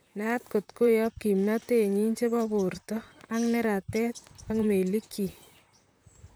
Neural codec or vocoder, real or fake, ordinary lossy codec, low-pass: vocoder, 44.1 kHz, 128 mel bands, Pupu-Vocoder; fake; none; none